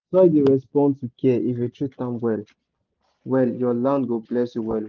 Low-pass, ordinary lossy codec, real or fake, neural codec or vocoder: 7.2 kHz; Opus, 24 kbps; real; none